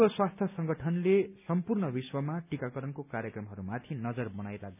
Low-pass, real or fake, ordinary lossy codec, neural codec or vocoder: 3.6 kHz; real; none; none